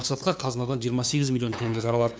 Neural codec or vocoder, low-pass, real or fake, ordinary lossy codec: codec, 16 kHz, 2 kbps, FunCodec, trained on LibriTTS, 25 frames a second; none; fake; none